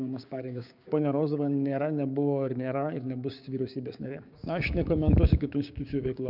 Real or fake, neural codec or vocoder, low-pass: fake; codec, 16 kHz, 6 kbps, DAC; 5.4 kHz